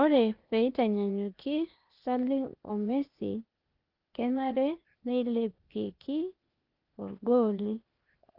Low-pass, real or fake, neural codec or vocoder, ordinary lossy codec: 5.4 kHz; fake; codec, 16 kHz, 0.8 kbps, ZipCodec; Opus, 32 kbps